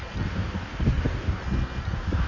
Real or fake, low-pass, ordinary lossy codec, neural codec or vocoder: fake; 7.2 kHz; none; codec, 16 kHz in and 24 kHz out, 1.1 kbps, FireRedTTS-2 codec